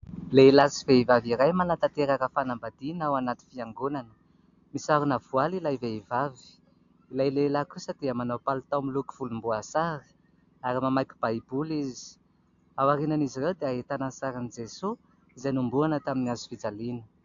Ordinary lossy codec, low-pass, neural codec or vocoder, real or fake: AAC, 64 kbps; 7.2 kHz; none; real